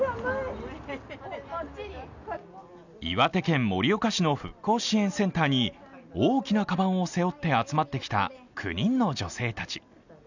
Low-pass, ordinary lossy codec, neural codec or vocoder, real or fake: 7.2 kHz; none; none; real